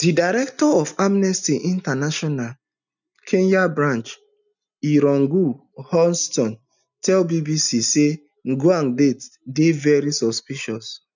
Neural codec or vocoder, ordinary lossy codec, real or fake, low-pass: none; none; real; 7.2 kHz